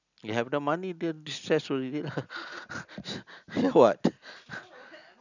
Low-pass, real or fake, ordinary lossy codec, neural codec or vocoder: 7.2 kHz; real; none; none